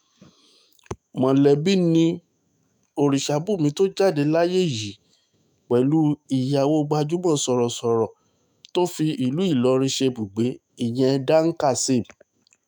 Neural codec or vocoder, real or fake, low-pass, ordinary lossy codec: autoencoder, 48 kHz, 128 numbers a frame, DAC-VAE, trained on Japanese speech; fake; none; none